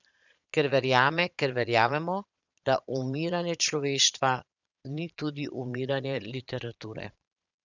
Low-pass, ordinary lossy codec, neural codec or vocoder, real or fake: 7.2 kHz; none; vocoder, 22.05 kHz, 80 mel bands, Vocos; fake